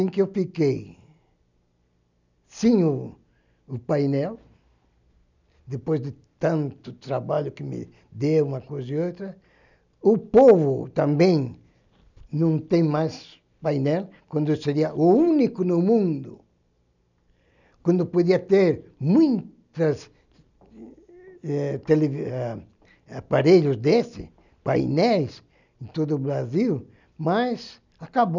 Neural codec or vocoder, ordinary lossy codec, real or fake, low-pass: none; none; real; 7.2 kHz